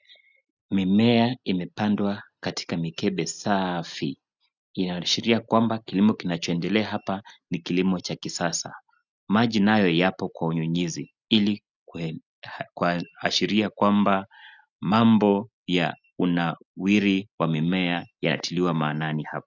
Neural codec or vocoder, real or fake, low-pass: none; real; 7.2 kHz